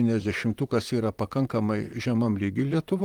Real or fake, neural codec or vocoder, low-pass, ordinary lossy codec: fake; vocoder, 44.1 kHz, 128 mel bands, Pupu-Vocoder; 19.8 kHz; Opus, 32 kbps